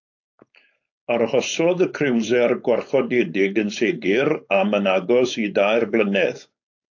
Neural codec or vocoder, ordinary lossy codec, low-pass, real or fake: codec, 16 kHz, 4.8 kbps, FACodec; AAC, 48 kbps; 7.2 kHz; fake